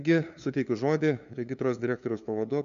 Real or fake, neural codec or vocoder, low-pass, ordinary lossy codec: fake; codec, 16 kHz, 2 kbps, FunCodec, trained on LibriTTS, 25 frames a second; 7.2 kHz; MP3, 96 kbps